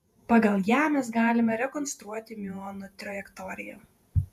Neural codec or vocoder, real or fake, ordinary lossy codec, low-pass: vocoder, 48 kHz, 128 mel bands, Vocos; fake; MP3, 96 kbps; 14.4 kHz